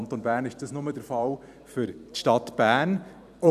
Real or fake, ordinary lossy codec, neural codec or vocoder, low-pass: fake; none; vocoder, 44.1 kHz, 128 mel bands every 256 samples, BigVGAN v2; 14.4 kHz